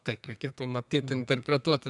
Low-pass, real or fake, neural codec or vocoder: 10.8 kHz; fake; codec, 32 kHz, 1.9 kbps, SNAC